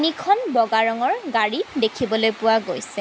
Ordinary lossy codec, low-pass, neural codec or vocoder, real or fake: none; none; none; real